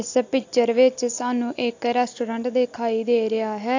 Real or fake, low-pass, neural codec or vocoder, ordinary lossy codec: real; 7.2 kHz; none; AAC, 48 kbps